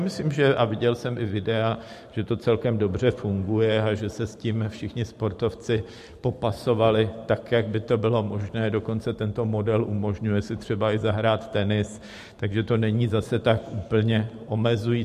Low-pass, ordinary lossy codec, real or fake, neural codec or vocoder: 14.4 kHz; MP3, 64 kbps; fake; vocoder, 44.1 kHz, 128 mel bands every 512 samples, BigVGAN v2